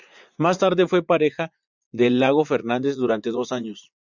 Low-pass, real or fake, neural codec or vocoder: 7.2 kHz; fake; vocoder, 44.1 kHz, 80 mel bands, Vocos